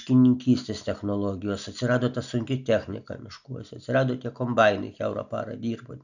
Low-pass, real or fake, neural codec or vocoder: 7.2 kHz; real; none